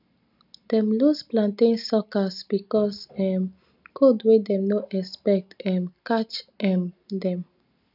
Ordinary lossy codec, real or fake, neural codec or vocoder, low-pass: none; real; none; 5.4 kHz